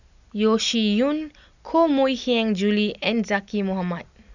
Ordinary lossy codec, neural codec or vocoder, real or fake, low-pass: none; none; real; 7.2 kHz